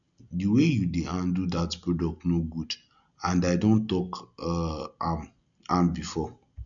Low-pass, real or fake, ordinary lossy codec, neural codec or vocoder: 7.2 kHz; real; none; none